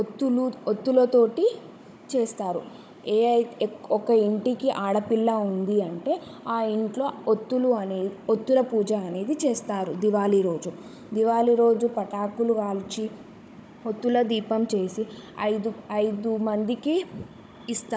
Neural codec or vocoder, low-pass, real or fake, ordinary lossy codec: codec, 16 kHz, 16 kbps, FunCodec, trained on Chinese and English, 50 frames a second; none; fake; none